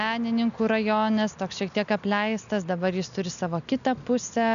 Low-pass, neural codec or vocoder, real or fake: 7.2 kHz; none; real